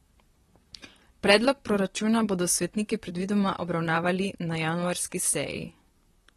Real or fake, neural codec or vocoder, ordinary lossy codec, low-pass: fake; vocoder, 44.1 kHz, 128 mel bands, Pupu-Vocoder; AAC, 32 kbps; 19.8 kHz